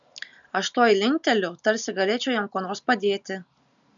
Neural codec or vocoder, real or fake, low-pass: none; real; 7.2 kHz